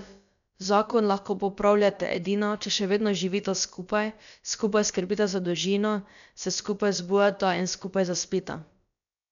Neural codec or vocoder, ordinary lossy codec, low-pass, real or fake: codec, 16 kHz, about 1 kbps, DyCAST, with the encoder's durations; none; 7.2 kHz; fake